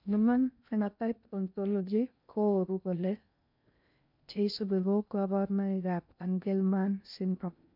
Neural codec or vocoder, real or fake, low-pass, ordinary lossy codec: codec, 16 kHz in and 24 kHz out, 0.6 kbps, FocalCodec, streaming, 2048 codes; fake; 5.4 kHz; none